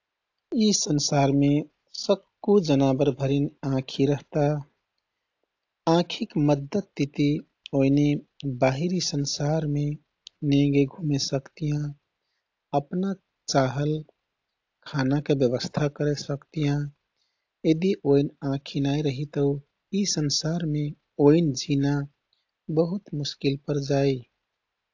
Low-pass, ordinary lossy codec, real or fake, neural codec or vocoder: 7.2 kHz; AAC, 48 kbps; real; none